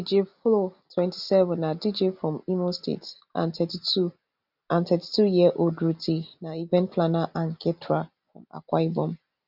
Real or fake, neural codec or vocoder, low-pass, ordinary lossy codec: real; none; 5.4 kHz; none